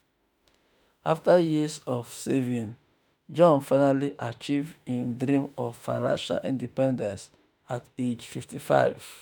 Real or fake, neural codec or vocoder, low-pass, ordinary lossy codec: fake; autoencoder, 48 kHz, 32 numbers a frame, DAC-VAE, trained on Japanese speech; none; none